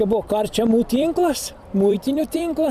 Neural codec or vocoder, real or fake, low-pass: vocoder, 44.1 kHz, 128 mel bands every 256 samples, BigVGAN v2; fake; 14.4 kHz